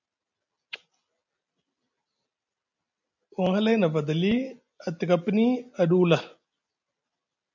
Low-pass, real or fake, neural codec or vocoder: 7.2 kHz; real; none